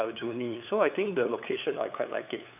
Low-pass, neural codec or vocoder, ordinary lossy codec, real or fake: 3.6 kHz; codec, 16 kHz, 8 kbps, FunCodec, trained on LibriTTS, 25 frames a second; none; fake